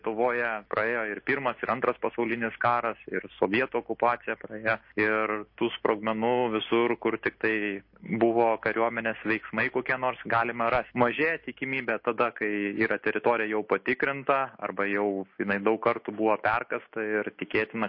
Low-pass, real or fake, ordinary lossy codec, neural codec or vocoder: 5.4 kHz; real; MP3, 32 kbps; none